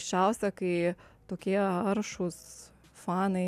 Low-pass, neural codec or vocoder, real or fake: 14.4 kHz; none; real